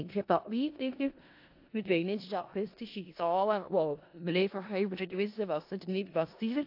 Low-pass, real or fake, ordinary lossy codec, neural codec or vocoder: 5.4 kHz; fake; AAC, 32 kbps; codec, 16 kHz in and 24 kHz out, 0.4 kbps, LongCat-Audio-Codec, four codebook decoder